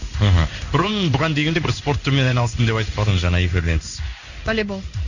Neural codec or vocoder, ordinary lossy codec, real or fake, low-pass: codec, 16 kHz in and 24 kHz out, 1 kbps, XY-Tokenizer; none; fake; 7.2 kHz